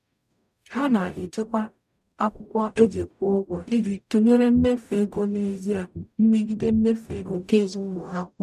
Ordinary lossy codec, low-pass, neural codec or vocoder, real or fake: none; 14.4 kHz; codec, 44.1 kHz, 0.9 kbps, DAC; fake